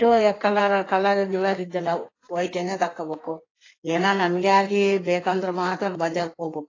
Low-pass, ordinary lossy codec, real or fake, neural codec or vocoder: 7.2 kHz; AAC, 32 kbps; fake; codec, 16 kHz in and 24 kHz out, 1.1 kbps, FireRedTTS-2 codec